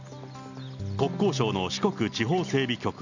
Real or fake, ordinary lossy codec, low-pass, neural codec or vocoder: real; none; 7.2 kHz; none